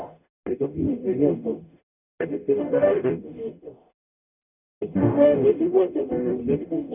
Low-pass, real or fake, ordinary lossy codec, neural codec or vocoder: 3.6 kHz; fake; none; codec, 44.1 kHz, 0.9 kbps, DAC